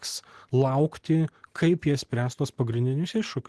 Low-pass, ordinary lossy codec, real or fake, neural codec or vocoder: 10.8 kHz; Opus, 16 kbps; real; none